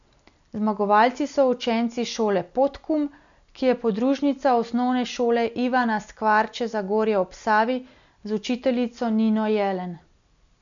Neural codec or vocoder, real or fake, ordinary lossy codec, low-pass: none; real; none; 7.2 kHz